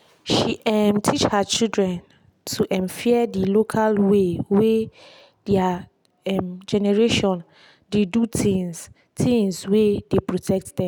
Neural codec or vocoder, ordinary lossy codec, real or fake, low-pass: none; none; real; 19.8 kHz